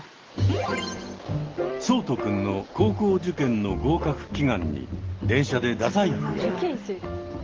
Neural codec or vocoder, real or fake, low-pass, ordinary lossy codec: none; real; 7.2 kHz; Opus, 16 kbps